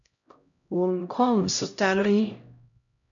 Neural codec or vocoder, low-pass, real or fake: codec, 16 kHz, 0.5 kbps, X-Codec, HuBERT features, trained on LibriSpeech; 7.2 kHz; fake